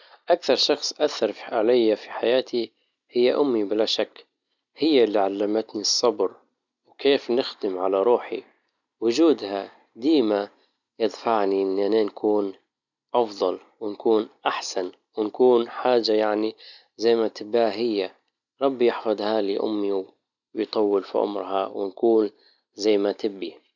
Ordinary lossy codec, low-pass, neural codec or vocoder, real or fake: none; 7.2 kHz; none; real